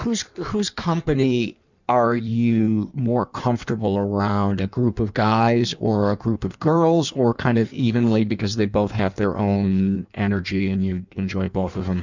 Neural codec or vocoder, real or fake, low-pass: codec, 16 kHz in and 24 kHz out, 1.1 kbps, FireRedTTS-2 codec; fake; 7.2 kHz